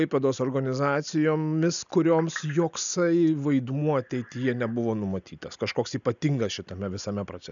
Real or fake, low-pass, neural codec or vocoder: real; 7.2 kHz; none